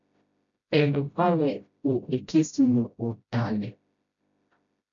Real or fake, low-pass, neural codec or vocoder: fake; 7.2 kHz; codec, 16 kHz, 0.5 kbps, FreqCodec, smaller model